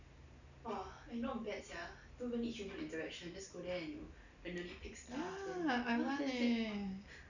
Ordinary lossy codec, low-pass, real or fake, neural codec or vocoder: none; 7.2 kHz; real; none